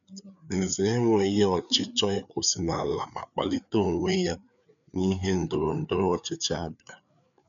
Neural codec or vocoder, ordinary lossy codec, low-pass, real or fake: codec, 16 kHz, 4 kbps, FreqCodec, larger model; none; 7.2 kHz; fake